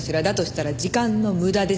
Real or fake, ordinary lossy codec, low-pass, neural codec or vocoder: real; none; none; none